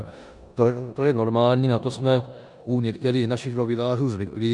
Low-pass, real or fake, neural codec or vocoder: 10.8 kHz; fake; codec, 16 kHz in and 24 kHz out, 0.9 kbps, LongCat-Audio-Codec, four codebook decoder